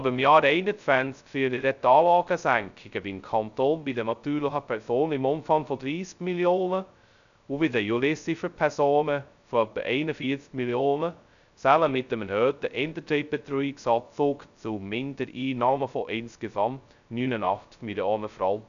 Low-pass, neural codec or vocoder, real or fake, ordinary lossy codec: 7.2 kHz; codec, 16 kHz, 0.2 kbps, FocalCodec; fake; none